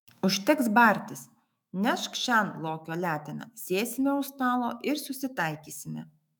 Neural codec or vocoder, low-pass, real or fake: autoencoder, 48 kHz, 128 numbers a frame, DAC-VAE, trained on Japanese speech; 19.8 kHz; fake